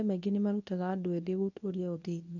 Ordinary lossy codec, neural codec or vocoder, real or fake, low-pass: none; codec, 24 kHz, 0.9 kbps, WavTokenizer, medium speech release version 1; fake; 7.2 kHz